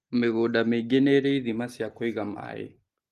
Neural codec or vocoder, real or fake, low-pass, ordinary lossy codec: none; real; 14.4 kHz; Opus, 24 kbps